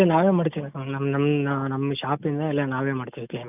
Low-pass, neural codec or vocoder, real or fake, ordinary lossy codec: 3.6 kHz; none; real; none